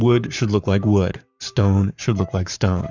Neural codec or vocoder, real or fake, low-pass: codec, 44.1 kHz, 7.8 kbps, Pupu-Codec; fake; 7.2 kHz